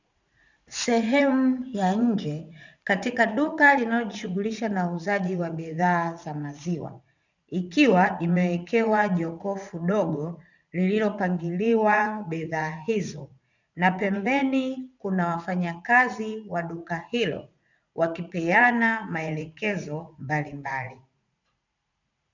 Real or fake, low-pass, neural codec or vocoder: fake; 7.2 kHz; vocoder, 44.1 kHz, 80 mel bands, Vocos